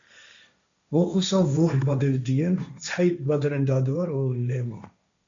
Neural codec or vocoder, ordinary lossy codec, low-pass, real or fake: codec, 16 kHz, 1.1 kbps, Voila-Tokenizer; MP3, 64 kbps; 7.2 kHz; fake